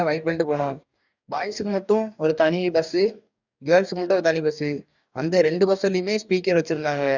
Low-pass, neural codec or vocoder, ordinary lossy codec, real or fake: 7.2 kHz; codec, 44.1 kHz, 2.6 kbps, DAC; none; fake